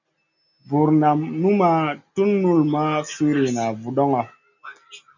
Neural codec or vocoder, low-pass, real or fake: none; 7.2 kHz; real